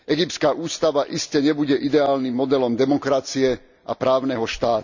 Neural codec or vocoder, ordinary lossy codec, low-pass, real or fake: none; none; 7.2 kHz; real